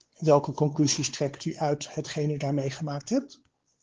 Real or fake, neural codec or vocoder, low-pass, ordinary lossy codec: fake; codec, 16 kHz, 4 kbps, X-Codec, WavLM features, trained on Multilingual LibriSpeech; 7.2 kHz; Opus, 16 kbps